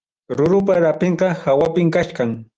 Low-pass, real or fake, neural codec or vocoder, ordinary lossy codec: 9.9 kHz; real; none; Opus, 24 kbps